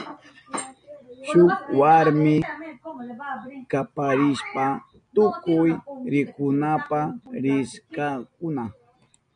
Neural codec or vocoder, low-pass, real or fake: none; 9.9 kHz; real